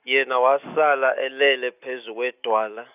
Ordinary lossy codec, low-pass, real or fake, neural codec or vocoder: none; 3.6 kHz; real; none